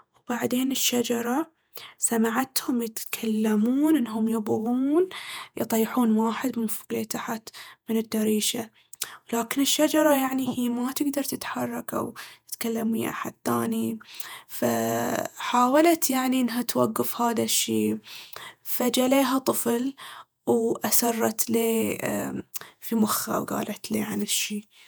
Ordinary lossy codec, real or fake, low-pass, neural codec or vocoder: none; fake; none; vocoder, 48 kHz, 128 mel bands, Vocos